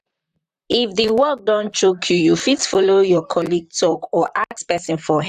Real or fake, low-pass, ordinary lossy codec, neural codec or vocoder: fake; 14.4 kHz; AAC, 96 kbps; vocoder, 44.1 kHz, 128 mel bands, Pupu-Vocoder